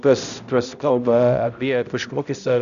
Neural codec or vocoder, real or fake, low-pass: codec, 16 kHz, 0.5 kbps, X-Codec, HuBERT features, trained on balanced general audio; fake; 7.2 kHz